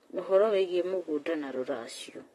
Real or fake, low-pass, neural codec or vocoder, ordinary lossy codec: fake; 19.8 kHz; vocoder, 44.1 kHz, 128 mel bands, Pupu-Vocoder; AAC, 32 kbps